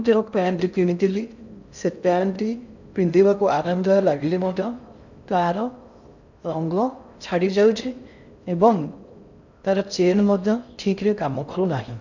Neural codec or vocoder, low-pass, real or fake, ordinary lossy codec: codec, 16 kHz in and 24 kHz out, 0.6 kbps, FocalCodec, streaming, 2048 codes; 7.2 kHz; fake; none